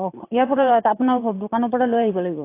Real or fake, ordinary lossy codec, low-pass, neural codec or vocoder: fake; AAC, 24 kbps; 3.6 kHz; vocoder, 44.1 kHz, 128 mel bands every 512 samples, BigVGAN v2